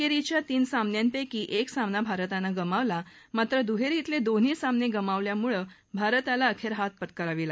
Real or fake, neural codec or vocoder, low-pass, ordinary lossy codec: real; none; none; none